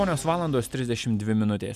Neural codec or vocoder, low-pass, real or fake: none; 14.4 kHz; real